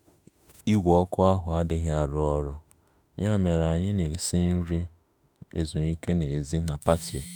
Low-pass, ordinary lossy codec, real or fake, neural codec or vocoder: none; none; fake; autoencoder, 48 kHz, 32 numbers a frame, DAC-VAE, trained on Japanese speech